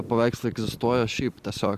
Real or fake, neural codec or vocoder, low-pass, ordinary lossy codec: real; none; 14.4 kHz; Opus, 64 kbps